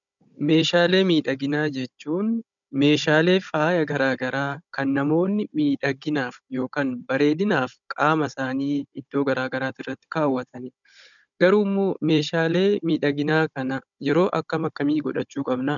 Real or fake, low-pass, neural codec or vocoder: fake; 7.2 kHz; codec, 16 kHz, 16 kbps, FunCodec, trained on Chinese and English, 50 frames a second